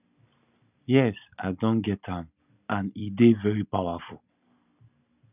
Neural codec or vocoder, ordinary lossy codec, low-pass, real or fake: none; none; 3.6 kHz; real